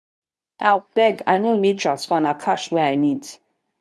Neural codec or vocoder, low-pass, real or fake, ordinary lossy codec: codec, 24 kHz, 0.9 kbps, WavTokenizer, medium speech release version 2; none; fake; none